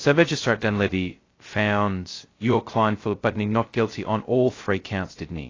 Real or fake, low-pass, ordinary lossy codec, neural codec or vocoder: fake; 7.2 kHz; AAC, 32 kbps; codec, 16 kHz, 0.2 kbps, FocalCodec